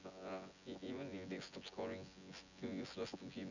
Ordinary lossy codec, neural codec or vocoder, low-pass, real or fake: none; vocoder, 24 kHz, 100 mel bands, Vocos; 7.2 kHz; fake